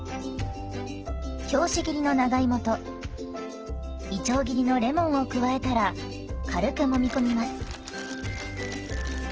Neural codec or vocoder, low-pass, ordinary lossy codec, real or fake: none; 7.2 kHz; Opus, 16 kbps; real